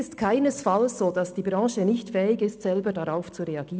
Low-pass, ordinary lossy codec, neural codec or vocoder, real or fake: none; none; none; real